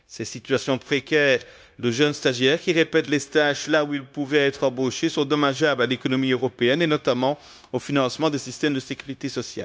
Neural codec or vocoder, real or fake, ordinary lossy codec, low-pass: codec, 16 kHz, 0.9 kbps, LongCat-Audio-Codec; fake; none; none